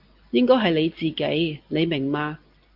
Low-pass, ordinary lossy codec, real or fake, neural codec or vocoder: 5.4 kHz; Opus, 24 kbps; real; none